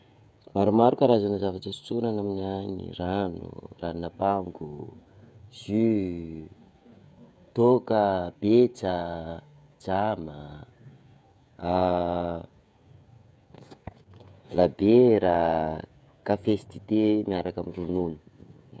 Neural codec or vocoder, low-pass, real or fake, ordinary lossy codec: codec, 16 kHz, 16 kbps, FreqCodec, smaller model; none; fake; none